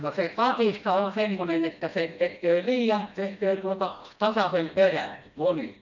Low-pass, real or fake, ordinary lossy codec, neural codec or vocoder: 7.2 kHz; fake; none; codec, 16 kHz, 1 kbps, FreqCodec, smaller model